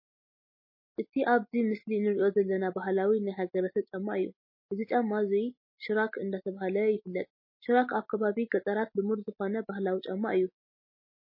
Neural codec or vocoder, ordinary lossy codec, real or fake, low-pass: none; MP3, 24 kbps; real; 5.4 kHz